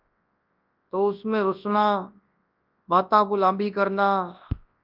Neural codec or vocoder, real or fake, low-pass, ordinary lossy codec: codec, 24 kHz, 0.9 kbps, WavTokenizer, large speech release; fake; 5.4 kHz; Opus, 24 kbps